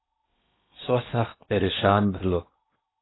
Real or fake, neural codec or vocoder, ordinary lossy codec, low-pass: fake; codec, 16 kHz in and 24 kHz out, 0.8 kbps, FocalCodec, streaming, 65536 codes; AAC, 16 kbps; 7.2 kHz